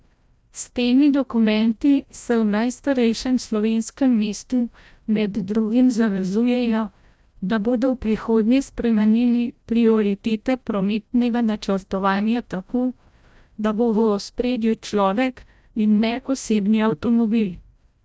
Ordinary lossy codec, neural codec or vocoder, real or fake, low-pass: none; codec, 16 kHz, 0.5 kbps, FreqCodec, larger model; fake; none